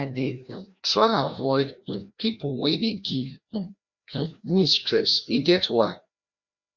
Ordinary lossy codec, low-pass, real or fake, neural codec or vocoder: Opus, 64 kbps; 7.2 kHz; fake; codec, 16 kHz, 1 kbps, FreqCodec, larger model